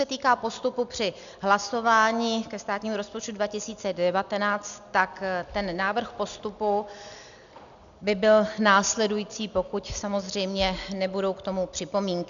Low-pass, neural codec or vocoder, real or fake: 7.2 kHz; none; real